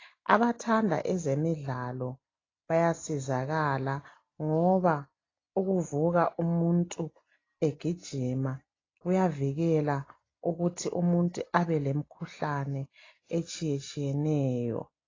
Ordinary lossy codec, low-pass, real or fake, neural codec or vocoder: AAC, 32 kbps; 7.2 kHz; real; none